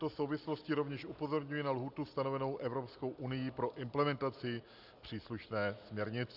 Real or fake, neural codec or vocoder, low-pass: real; none; 5.4 kHz